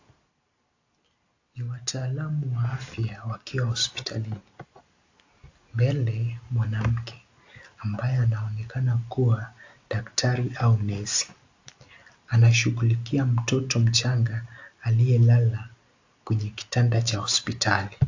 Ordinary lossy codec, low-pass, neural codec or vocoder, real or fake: AAC, 48 kbps; 7.2 kHz; none; real